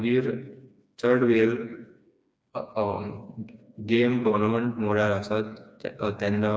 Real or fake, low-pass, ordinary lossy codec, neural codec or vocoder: fake; none; none; codec, 16 kHz, 2 kbps, FreqCodec, smaller model